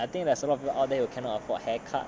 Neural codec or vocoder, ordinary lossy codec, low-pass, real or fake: none; none; none; real